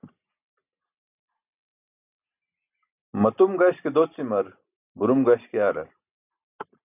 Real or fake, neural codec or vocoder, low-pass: real; none; 3.6 kHz